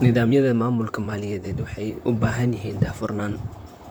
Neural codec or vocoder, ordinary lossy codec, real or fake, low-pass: vocoder, 44.1 kHz, 128 mel bands, Pupu-Vocoder; none; fake; none